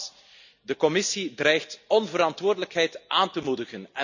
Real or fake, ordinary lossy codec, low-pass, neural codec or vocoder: real; none; 7.2 kHz; none